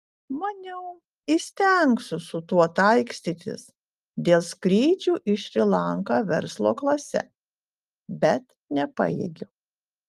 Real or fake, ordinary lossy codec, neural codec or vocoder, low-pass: real; Opus, 32 kbps; none; 14.4 kHz